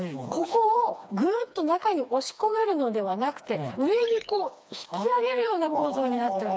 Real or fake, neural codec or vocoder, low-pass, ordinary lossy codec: fake; codec, 16 kHz, 2 kbps, FreqCodec, smaller model; none; none